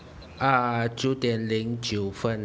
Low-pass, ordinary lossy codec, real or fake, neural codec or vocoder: none; none; real; none